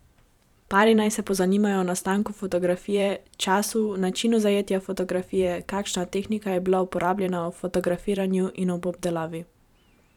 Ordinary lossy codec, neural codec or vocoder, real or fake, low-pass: none; vocoder, 44.1 kHz, 128 mel bands every 512 samples, BigVGAN v2; fake; 19.8 kHz